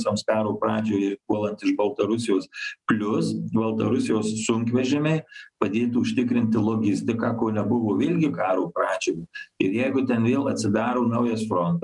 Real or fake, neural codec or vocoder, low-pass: real; none; 10.8 kHz